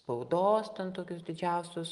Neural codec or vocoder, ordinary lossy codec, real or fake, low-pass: none; Opus, 32 kbps; real; 10.8 kHz